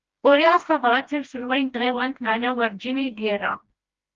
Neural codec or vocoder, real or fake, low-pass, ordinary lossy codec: codec, 16 kHz, 1 kbps, FreqCodec, smaller model; fake; 7.2 kHz; Opus, 32 kbps